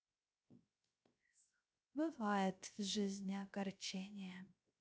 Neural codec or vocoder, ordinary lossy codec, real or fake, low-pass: codec, 16 kHz, 0.3 kbps, FocalCodec; none; fake; none